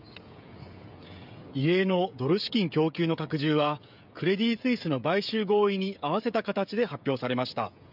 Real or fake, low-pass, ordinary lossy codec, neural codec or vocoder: fake; 5.4 kHz; none; codec, 16 kHz, 16 kbps, FreqCodec, smaller model